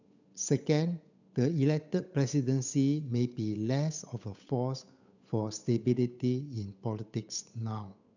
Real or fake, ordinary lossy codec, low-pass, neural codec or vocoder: fake; none; 7.2 kHz; codec, 16 kHz, 8 kbps, FunCodec, trained on Chinese and English, 25 frames a second